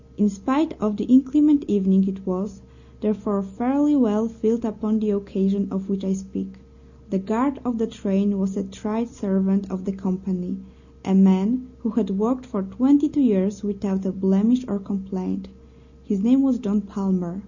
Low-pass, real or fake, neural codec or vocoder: 7.2 kHz; real; none